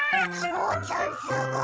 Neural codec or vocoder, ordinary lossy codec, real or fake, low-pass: codec, 16 kHz, 16 kbps, FreqCodec, larger model; none; fake; none